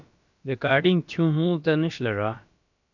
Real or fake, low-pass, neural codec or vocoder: fake; 7.2 kHz; codec, 16 kHz, about 1 kbps, DyCAST, with the encoder's durations